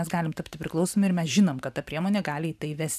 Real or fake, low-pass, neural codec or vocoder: fake; 14.4 kHz; vocoder, 44.1 kHz, 128 mel bands every 512 samples, BigVGAN v2